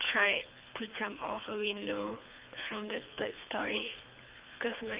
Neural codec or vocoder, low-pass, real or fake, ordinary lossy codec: codec, 24 kHz, 3 kbps, HILCodec; 3.6 kHz; fake; Opus, 24 kbps